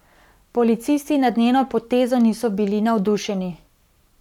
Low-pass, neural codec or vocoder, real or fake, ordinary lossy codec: 19.8 kHz; codec, 44.1 kHz, 7.8 kbps, Pupu-Codec; fake; none